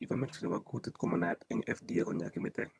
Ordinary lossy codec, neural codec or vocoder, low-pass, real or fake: none; vocoder, 22.05 kHz, 80 mel bands, HiFi-GAN; none; fake